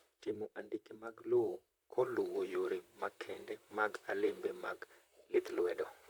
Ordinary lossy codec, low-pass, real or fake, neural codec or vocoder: none; none; fake; vocoder, 44.1 kHz, 128 mel bands, Pupu-Vocoder